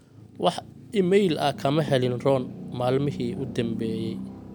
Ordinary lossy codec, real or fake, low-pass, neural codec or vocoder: none; real; none; none